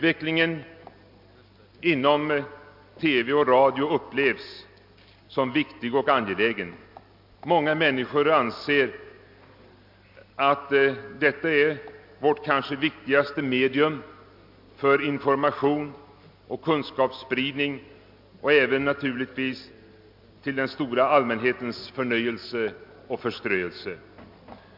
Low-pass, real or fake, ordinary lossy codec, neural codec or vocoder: 5.4 kHz; real; MP3, 48 kbps; none